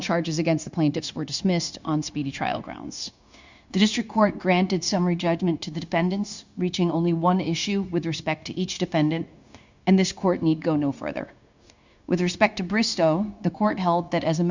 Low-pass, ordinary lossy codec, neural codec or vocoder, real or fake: 7.2 kHz; Opus, 64 kbps; codec, 16 kHz, 0.9 kbps, LongCat-Audio-Codec; fake